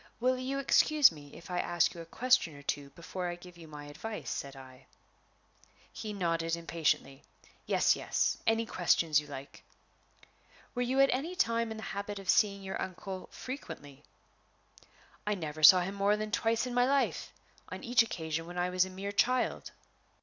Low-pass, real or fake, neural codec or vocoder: 7.2 kHz; real; none